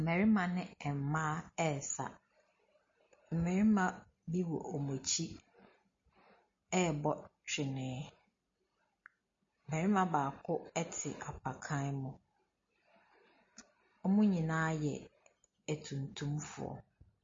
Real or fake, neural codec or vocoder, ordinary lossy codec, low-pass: real; none; MP3, 32 kbps; 7.2 kHz